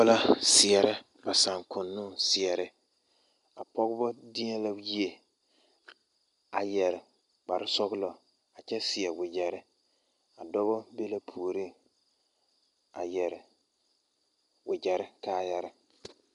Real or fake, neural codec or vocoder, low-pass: real; none; 10.8 kHz